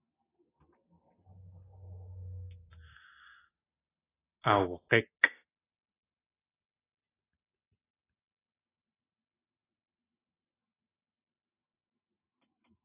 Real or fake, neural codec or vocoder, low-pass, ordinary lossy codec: real; none; 3.6 kHz; AAC, 16 kbps